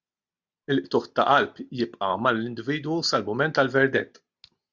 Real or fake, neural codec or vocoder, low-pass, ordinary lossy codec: real; none; 7.2 kHz; Opus, 64 kbps